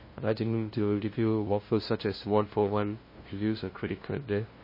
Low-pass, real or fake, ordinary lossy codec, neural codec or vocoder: 5.4 kHz; fake; MP3, 24 kbps; codec, 16 kHz, 0.5 kbps, FunCodec, trained on LibriTTS, 25 frames a second